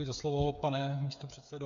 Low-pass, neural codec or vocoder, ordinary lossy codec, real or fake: 7.2 kHz; codec, 16 kHz, 8 kbps, FreqCodec, smaller model; MP3, 64 kbps; fake